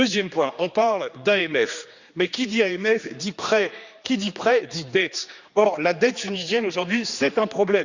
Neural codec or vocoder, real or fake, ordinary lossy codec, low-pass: codec, 16 kHz, 2 kbps, X-Codec, HuBERT features, trained on general audio; fake; Opus, 64 kbps; 7.2 kHz